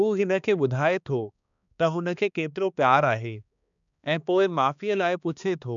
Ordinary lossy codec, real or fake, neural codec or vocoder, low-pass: none; fake; codec, 16 kHz, 2 kbps, X-Codec, HuBERT features, trained on balanced general audio; 7.2 kHz